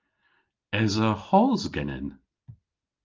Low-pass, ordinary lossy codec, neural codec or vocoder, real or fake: 7.2 kHz; Opus, 32 kbps; none; real